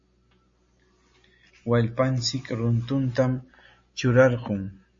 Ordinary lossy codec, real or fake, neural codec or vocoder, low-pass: MP3, 32 kbps; real; none; 7.2 kHz